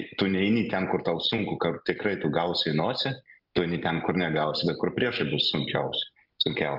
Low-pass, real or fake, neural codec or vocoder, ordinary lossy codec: 5.4 kHz; real; none; Opus, 24 kbps